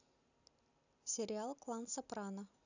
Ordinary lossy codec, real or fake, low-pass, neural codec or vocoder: none; real; 7.2 kHz; none